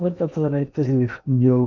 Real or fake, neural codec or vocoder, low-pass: fake; codec, 16 kHz in and 24 kHz out, 0.8 kbps, FocalCodec, streaming, 65536 codes; 7.2 kHz